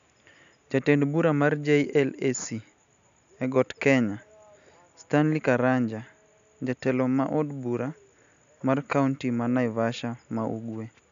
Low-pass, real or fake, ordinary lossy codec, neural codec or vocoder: 7.2 kHz; real; none; none